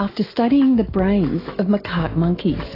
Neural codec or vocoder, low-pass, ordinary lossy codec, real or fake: autoencoder, 48 kHz, 128 numbers a frame, DAC-VAE, trained on Japanese speech; 5.4 kHz; AAC, 24 kbps; fake